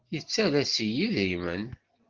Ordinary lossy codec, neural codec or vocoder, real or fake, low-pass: Opus, 16 kbps; none; real; 7.2 kHz